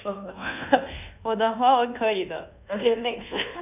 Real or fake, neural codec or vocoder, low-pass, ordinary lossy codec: fake; codec, 24 kHz, 1.2 kbps, DualCodec; 3.6 kHz; none